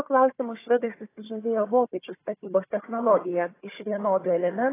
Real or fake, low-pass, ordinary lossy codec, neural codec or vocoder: fake; 3.6 kHz; AAC, 16 kbps; codec, 16 kHz, 4 kbps, FunCodec, trained on Chinese and English, 50 frames a second